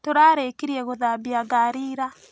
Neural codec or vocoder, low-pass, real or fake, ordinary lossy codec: none; none; real; none